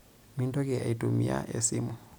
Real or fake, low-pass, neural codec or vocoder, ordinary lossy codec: real; none; none; none